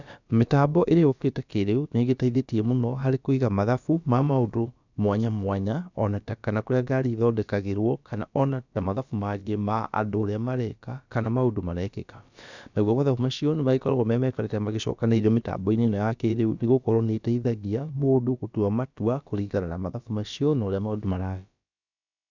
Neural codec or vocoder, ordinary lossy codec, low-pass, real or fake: codec, 16 kHz, about 1 kbps, DyCAST, with the encoder's durations; none; 7.2 kHz; fake